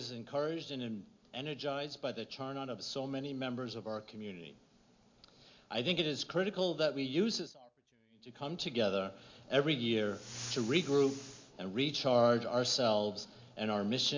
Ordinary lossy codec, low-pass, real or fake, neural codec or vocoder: MP3, 48 kbps; 7.2 kHz; real; none